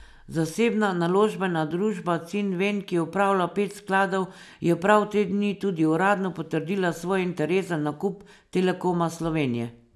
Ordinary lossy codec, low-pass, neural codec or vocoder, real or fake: none; none; none; real